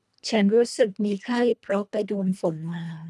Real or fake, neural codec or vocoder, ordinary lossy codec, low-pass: fake; codec, 24 kHz, 1.5 kbps, HILCodec; none; none